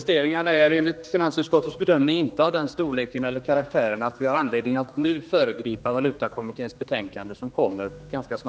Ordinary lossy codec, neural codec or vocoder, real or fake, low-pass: none; codec, 16 kHz, 2 kbps, X-Codec, HuBERT features, trained on general audio; fake; none